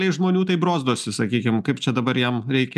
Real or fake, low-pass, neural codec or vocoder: real; 14.4 kHz; none